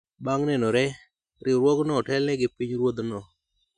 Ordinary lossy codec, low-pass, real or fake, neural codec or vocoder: none; 9.9 kHz; real; none